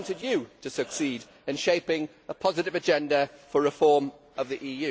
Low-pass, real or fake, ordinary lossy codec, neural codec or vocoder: none; real; none; none